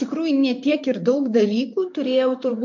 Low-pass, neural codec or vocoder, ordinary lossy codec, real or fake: 7.2 kHz; vocoder, 44.1 kHz, 128 mel bands, Pupu-Vocoder; MP3, 48 kbps; fake